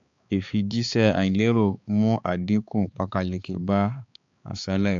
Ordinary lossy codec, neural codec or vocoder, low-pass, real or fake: AAC, 64 kbps; codec, 16 kHz, 4 kbps, X-Codec, HuBERT features, trained on balanced general audio; 7.2 kHz; fake